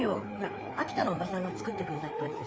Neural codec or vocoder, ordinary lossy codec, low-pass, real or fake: codec, 16 kHz, 4 kbps, FreqCodec, larger model; none; none; fake